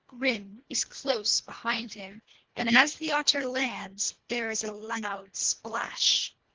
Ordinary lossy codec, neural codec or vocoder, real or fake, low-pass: Opus, 16 kbps; codec, 24 kHz, 1.5 kbps, HILCodec; fake; 7.2 kHz